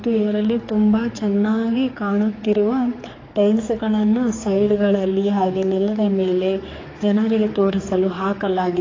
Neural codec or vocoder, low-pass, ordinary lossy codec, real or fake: codec, 16 kHz, 4 kbps, X-Codec, HuBERT features, trained on general audio; 7.2 kHz; AAC, 32 kbps; fake